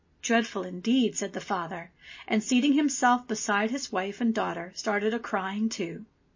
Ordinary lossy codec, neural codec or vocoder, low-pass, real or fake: MP3, 32 kbps; none; 7.2 kHz; real